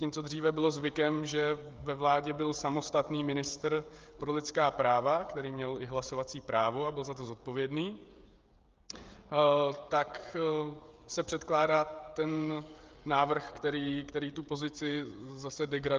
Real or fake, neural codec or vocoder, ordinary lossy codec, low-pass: fake; codec, 16 kHz, 16 kbps, FreqCodec, smaller model; Opus, 32 kbps; 7.2 kHz